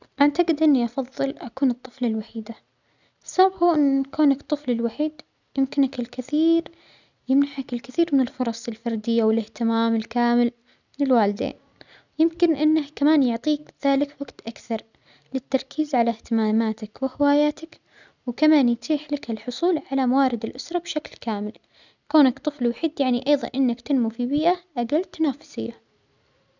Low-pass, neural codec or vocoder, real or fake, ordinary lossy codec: 7.2 kHz; none; real; none